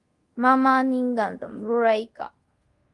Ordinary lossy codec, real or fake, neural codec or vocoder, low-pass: Opus, 24 kbps; fake; codec, 24 kHz, 0.5 kbps, DualCodec; 10.8 kHz